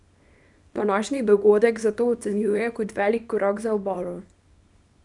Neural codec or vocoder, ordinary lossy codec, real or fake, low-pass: codec, 24 kHz, 0.9 kbps, WavTokenizer, small release; none; fake; 10.8 kHz